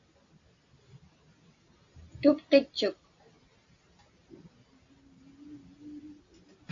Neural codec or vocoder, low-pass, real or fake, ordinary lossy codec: none; 7.2 kHz; real; AAC, 48 kbps